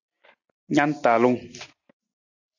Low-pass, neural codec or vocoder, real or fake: 7.2 kHz; none; real